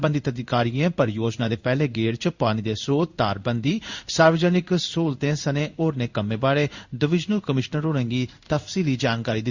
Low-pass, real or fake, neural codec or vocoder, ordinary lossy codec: 7.2 kHz; fake; codec, 16 kHz in and 24 kHz out, 1 kbps, XY-Tokenizer; Opus, 64 kbps